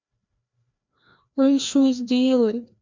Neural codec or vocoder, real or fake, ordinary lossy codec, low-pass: codec, 16 kHz, 1 kbps, FreqCodec, larger model; fake; MP3, 64 kbps; 7.2 kHz